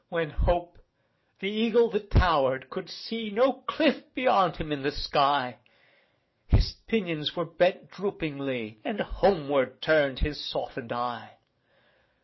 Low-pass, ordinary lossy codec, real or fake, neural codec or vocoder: 7.2 kHz; MP3, 24 kbps; fake; codec, 44.1 kHz, 7.8 kbps, DAC